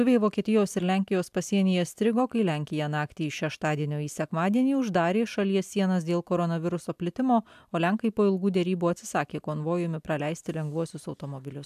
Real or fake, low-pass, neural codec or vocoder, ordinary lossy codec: real; 14.4 kHz; none; AAC, 96 kbps